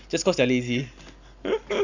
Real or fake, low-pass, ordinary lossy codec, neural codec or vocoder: real; 7.2 kHz; none; none